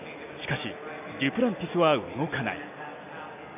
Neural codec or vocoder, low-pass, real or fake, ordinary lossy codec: none; 3.6 kHz; real; none